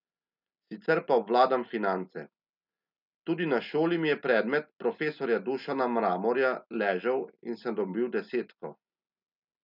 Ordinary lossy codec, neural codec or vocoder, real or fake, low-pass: none; none; real; 5.4 kHz